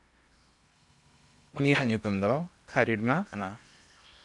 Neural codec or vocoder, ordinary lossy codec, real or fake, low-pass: codec, 16 kHz in and 24 kHz out, 0.8 kbps, FocalCodec, streaming, 65536 codes; MP3, 96 kbps; fake; 10.8 kHz